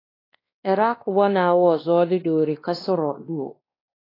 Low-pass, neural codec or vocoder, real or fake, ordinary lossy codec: 5.4 kHz; codec, 16 kHz, 2 kbps, X-Codec, WavLM features, trained on Multilingual LibriSpeech; fake; AAC, 24 kbps